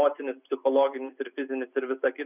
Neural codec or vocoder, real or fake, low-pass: none; real; 3.6 kHz